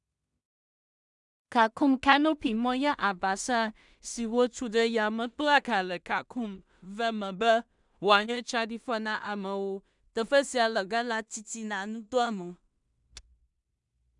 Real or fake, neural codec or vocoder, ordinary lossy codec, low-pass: fake; codec, 16 kHz in and 24 kHz out, 0.4 kbps, LongCat-Audio-Codec, two codebook decoder; none; 10.8 kHz